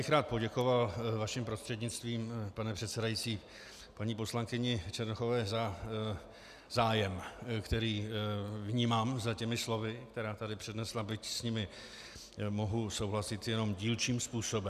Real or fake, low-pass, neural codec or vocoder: real; 14.4 kHz; none